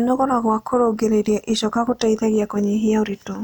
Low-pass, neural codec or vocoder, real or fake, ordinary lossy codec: none; none; real; none